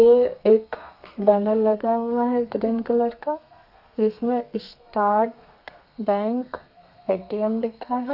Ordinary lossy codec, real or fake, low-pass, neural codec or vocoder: none; fake; 5.4 kHz; codec, 32 kHz, 1.9 kbps, SNAC